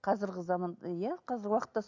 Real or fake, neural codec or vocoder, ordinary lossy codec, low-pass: real; none; none; 7.2 kHz